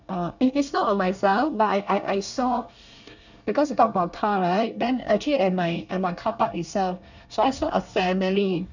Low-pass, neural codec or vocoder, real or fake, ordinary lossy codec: 7.2 kHz; codec, 24 kHz, 1 kbps, SNAC; fake; none